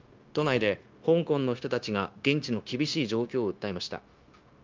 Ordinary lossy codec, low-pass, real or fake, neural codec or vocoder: Opus, 24 kbps; 7.2 kHz; fake; codec, 16 kHz, 0.9 kbps, LongCat-Audio-Codec